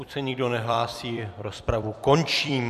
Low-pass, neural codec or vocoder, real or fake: 14.4 kHz; vocoder, 44.1 kHz, 128 mel bands every 256 samples, BigVGAN v2; fake